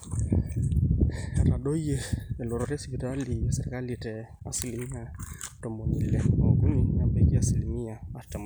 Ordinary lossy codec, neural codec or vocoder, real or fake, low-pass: none; none; real; none